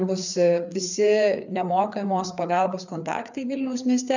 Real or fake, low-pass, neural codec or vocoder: fake; 7.2 kHz; codec, 16 kHz, 4 kbps, FreqCodec, larger model